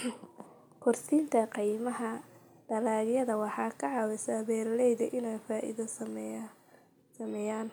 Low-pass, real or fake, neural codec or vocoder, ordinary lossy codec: none; real; none; none